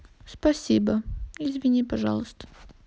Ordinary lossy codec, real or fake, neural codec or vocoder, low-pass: none; real; none; none